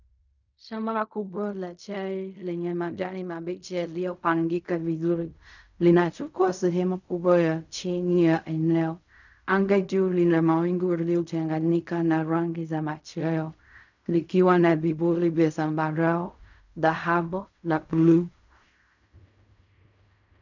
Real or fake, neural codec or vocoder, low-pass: fake; codec, 16 kHz in and 24 kHz out, 0.4 kbps, LongCat-Audio-Codec, fine tuned four codebook decoder; 7.2 kHz